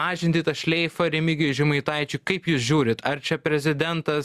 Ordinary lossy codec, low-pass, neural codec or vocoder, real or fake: Opus, 64 kbps; 14.4 kHz; none; real